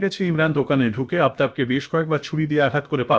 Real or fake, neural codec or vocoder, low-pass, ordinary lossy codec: fake; codec, 16 kHz, about 1 kbps, DyCAST, with the encoder's durations; none; none